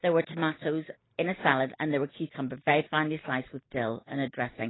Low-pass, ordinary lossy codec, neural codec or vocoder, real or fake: 7.2 kHz; AAC, 16 kbps; none; real